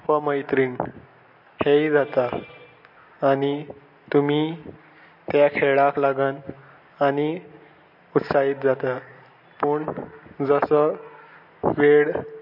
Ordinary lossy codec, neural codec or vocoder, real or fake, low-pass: MP3, 32 kbps; none; real; 5.4 kHz